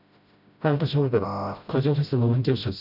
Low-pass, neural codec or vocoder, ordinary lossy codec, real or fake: 5.4 kHz; codec, 16 kHz, 0.5 kbps, FreqCodec, smaller model; none; fake